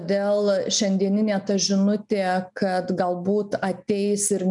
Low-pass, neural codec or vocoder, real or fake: 10.8 kHz; none; real